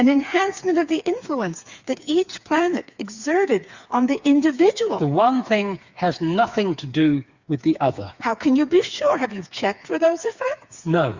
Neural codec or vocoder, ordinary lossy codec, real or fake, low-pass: codec, 16 kHz, 4 kbps, FreqCodec, smaller model; Opus, 64 kbps; fake; 7.2 kHz